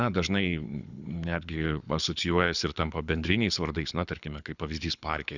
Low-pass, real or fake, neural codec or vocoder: 7.2 kHz; fake; codec, 24 kHz, 6 kbps, HILCodec